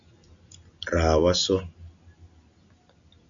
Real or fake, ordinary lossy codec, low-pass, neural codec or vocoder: real; MP3, 96 kbps; 7.2 kHz; none